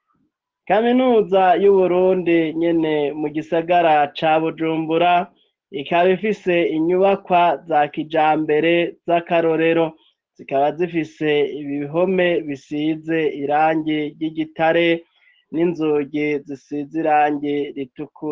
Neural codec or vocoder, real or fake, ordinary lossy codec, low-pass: none; real; Opus, 16 kbps; 7.2 kHz